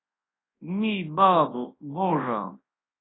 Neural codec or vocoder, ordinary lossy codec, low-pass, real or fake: codec, 24 kHz, 0.9 kbps, WavTokenizer, large speech release; AAC, 16 kbps; 7.2 kHz; fake